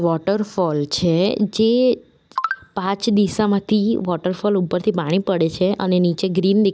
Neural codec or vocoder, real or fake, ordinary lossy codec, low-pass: none; real; none; none